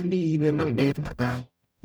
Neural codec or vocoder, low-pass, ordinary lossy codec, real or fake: codec, 44.1 kHz, 0.9 kbps, DAC; none; none; fake